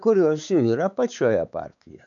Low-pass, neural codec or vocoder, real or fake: 7.2 kHz; codec, 16 kHz, 4 kbps, X-Codec, WavLM features, trained on Multilingual LibriSpeech; fake